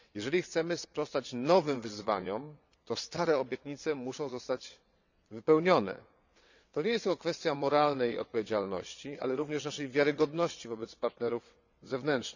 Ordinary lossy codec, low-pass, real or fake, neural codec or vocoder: none; 7.2 kHz; fake; vocoder, 22.05 kHz, 80 mel bands, WaveNeXt